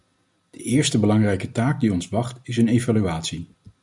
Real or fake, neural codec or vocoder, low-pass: real; none; 10.8 kHz